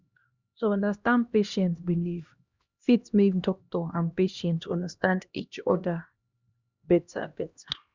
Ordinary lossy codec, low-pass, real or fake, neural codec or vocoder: Opus, 64 kbps; 7.2 kHz; fake; codec, 16 kHz, 1 kbps, X-Codec, HuBERT features, trained on LibriSpeech